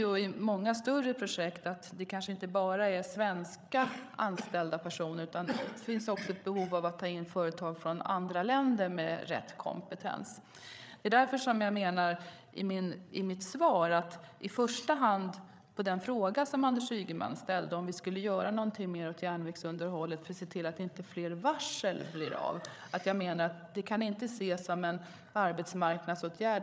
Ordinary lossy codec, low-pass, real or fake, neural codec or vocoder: none; none; fake; codec, 16 kHz, 8 kbps, FreqCodec, larger model